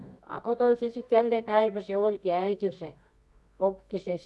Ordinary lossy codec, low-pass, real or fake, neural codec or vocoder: none; none; fake; codec, 24 kHz, 0.9 kbps, WavTokenizer, medium music audio release